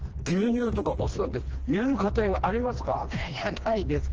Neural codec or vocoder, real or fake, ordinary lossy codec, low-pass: codec, 16 kHz, 2 kbps, FreqCodec, smaller model; fake; Opus, 16 kbps; 7.2 kHz